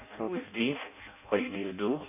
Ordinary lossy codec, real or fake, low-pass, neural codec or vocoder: none; fake; 3.6 kHz; codec, 16 kHz in and 24 kHz out, 0.6 kbps, FireRedTTS-2 codec